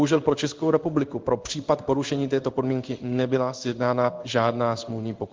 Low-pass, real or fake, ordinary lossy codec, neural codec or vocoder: 7.2 kHz; fake; Opus, 24 kbps; codec, 16 kHz in and 24 kHz out, 1 kbps, XY-Tokenizer